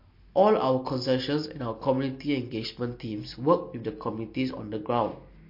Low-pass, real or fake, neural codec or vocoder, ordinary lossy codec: 5.4 kHz; real; none; MP3, 32 kbps